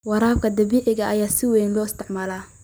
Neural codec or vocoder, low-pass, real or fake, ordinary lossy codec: none; none; real; none